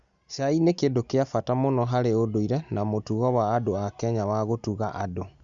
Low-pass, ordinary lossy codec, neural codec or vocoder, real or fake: 7.2 kHz; Opus, 64 kbps; none; real